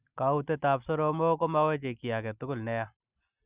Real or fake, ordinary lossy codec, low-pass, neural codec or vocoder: real; Opus, 64 kbps; 3.6 kHz; none